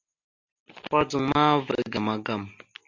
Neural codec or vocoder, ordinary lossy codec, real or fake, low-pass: none; MP3, 48 kbps; real; 7.2 kHz